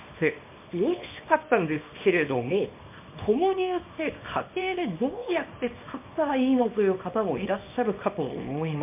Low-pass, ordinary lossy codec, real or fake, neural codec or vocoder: 3.6 kHz; MP3, 32 kbps; fake; codec, 24 kHz, 0.9 kbps, WavTokenizer, small release